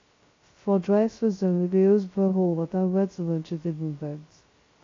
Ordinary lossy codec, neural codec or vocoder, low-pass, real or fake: AAC, 32 kbps; codec, 16 kHz, 0.2 kbps, FocalCodec; 7.2 kHz; fake